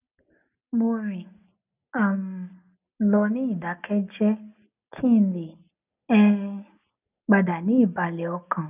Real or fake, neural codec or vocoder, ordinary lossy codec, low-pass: real; none; none; 3.6 kHz